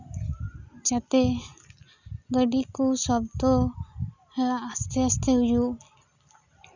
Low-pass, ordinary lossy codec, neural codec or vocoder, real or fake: 7.2 kHz; none; none; real